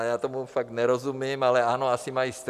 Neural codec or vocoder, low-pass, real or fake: none; 14.4 kHz; real